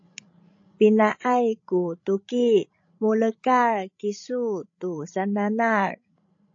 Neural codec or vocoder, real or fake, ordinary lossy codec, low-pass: codec, 16 kHz, 16 kbps, FreqCodec, larger model; fake; AAC, 48 kbps; 7.2 kHz